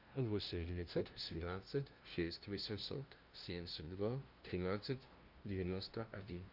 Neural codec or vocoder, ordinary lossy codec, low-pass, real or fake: codec, 16 kHz, 0.5 kbps, FunCodec, trained on LibriTTS, 25 frames a second; Opus, 32 kbps; 5.4 kHz; fake